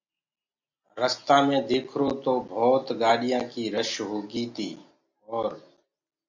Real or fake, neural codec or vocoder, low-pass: real; none; 7.2 kHz